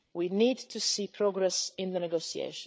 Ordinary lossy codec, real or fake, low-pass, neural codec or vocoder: none; fake; none; codec, 16 kHz, 8 kbps, FreqCodec, larger model